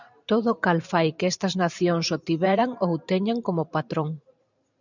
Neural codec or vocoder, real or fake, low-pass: vocoder, 44.1 kHz, 128 mel bands every 512 samples, BigVGAN v2; fake; 7.2 kHz